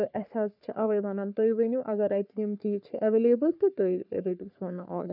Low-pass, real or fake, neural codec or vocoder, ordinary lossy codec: 5.4 kHz; fake; codec, 44.1 kHz, 3.4 kbps, Pupu-Codec; none